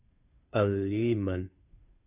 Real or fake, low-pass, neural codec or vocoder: fake; 3.6 kHz; codec, 16 kHz, 2 kbps, FunCodec, trained on Chinese and English, 25 frames a second